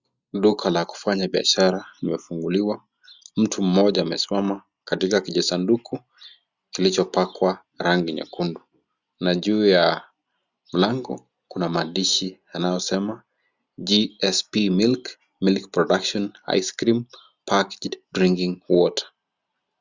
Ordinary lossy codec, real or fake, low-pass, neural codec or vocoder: Opus, 64 kbps; real; 7.2 kHz; none